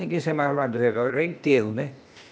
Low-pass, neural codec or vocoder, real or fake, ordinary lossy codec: none; codec, 16 kHz, 0.8 kbps, ZipCodec; fake; none